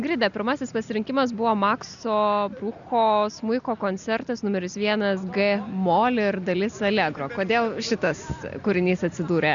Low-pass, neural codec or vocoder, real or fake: 7.2 kHz; none; real